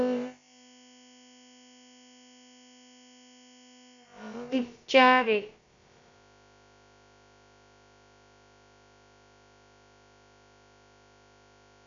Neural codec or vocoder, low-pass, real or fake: codec, 16 kHz, about 1 kbps, DyCAST, with the encoder's durations; 7.2 kHz; fake